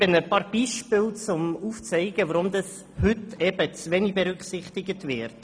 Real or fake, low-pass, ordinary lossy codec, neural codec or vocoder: real; none; none; none